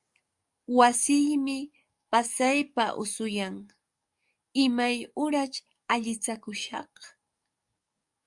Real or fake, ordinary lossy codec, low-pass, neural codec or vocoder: fake; Opus, 64 kbps; 10.8 kHz; codec, 44.1 kHz, 7.8 kbps, DAC